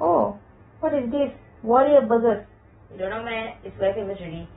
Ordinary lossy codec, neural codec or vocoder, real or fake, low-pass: AAC, 16 kbps; none; real; 7.2 kHz